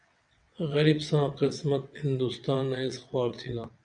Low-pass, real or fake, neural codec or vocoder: 9.9 kHz; fake; vocoder, 22.05 kHz, 80 mel bands, WaveNeXt